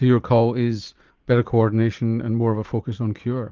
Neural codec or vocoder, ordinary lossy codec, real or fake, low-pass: vocoder, 44.1 kHz, 80 mel bands, Vocos; Opus, 24 kbps; fake; 7.2 kHz